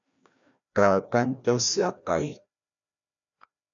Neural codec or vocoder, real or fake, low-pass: codec, 16 kHz, 1 kbps, FreqCodec, larger model; fake; 7.2 kHz